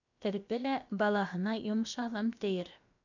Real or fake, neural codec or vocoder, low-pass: fake; codec, 16 kHz, about 1 kbps, DyCAST, with the encoder's durations; 7.2 kHz